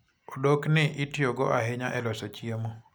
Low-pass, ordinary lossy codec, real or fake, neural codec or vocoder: none; none; real; none